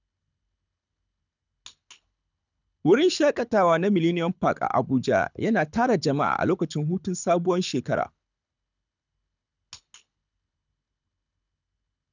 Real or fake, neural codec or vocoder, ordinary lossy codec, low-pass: fake; codec, 24 kHz, 6 kbps, HILCodec; none; 7.2 kHz